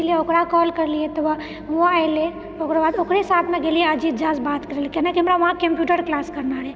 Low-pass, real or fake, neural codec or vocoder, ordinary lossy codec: none; real; none; none